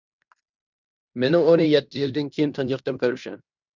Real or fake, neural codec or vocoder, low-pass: fake; codec, 16 kHz in and 24 kHz out, 0.9 kbps, LongCat-Audio-Codec, fine tuned four codebook decoder; 7.2 kHz